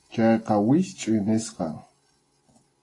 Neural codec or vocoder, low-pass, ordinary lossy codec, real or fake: none; 10.8 kHz; AAC, 32 kbps; real